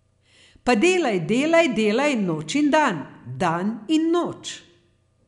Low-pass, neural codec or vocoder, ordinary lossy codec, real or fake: 10.8 kHz; none; none; real